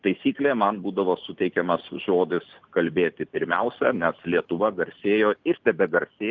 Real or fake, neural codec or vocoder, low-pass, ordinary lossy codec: real; none; 7.2 kHz; Opus, 24 kbps